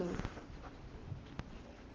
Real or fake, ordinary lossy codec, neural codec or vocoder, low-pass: real; Opus, 16 kbps; none; 7.2 kHz